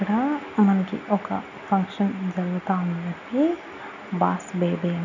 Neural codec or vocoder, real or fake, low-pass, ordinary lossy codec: none; real; 7.2 kHz; none